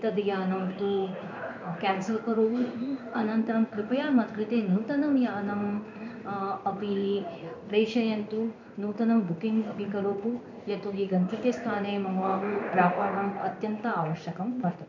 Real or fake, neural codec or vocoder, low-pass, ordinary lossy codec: fake; codec, 16 kHz in and 24 kHz out, 1 kbps, XY-Tokenizer; 7.2 kHz; MP3, 64 kbps